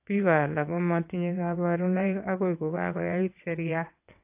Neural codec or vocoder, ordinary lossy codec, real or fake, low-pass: vocoder, 22.05 kHz, 80 mel bands, WaveNeXt; AAC, 32 kbps; fake; 3.6 kHz